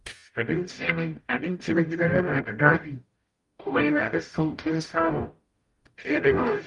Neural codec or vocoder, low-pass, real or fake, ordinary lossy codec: codec, 44.1 kHz, 0.9 kbps, DAC; 10.8 kHz; fake; Opus, 32 kbps